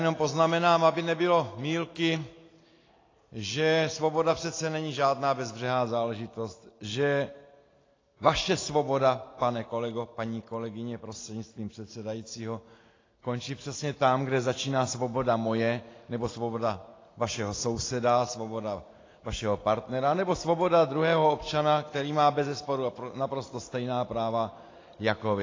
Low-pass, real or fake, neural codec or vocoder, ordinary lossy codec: 7.2 kHz; real; none; AAC, 32 kbps